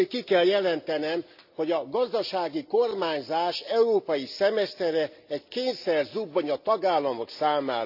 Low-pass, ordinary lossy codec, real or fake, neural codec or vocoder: 5.4 kHz; AAC, 48 kbps; real; none